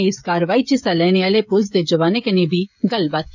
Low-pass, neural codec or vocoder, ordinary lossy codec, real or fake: 7.2 kHz; codec, 16 kHz, 16 kbps, FreqCodec, smaller model; none; fake